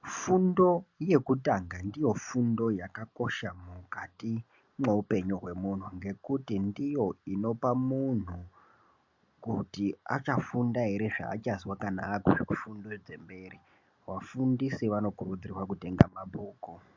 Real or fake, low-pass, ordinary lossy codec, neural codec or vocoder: real; 7.2 kHz; MP3, 64 kbps; none